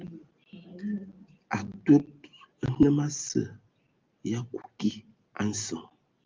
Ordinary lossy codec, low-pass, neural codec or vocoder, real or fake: Opus, 32 kbps; 7.2 kHz; none; real